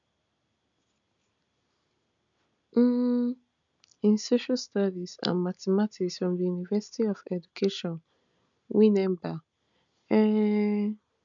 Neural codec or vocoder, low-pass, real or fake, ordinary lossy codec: none; 7.2 kHz; real; MP3, 96 kbps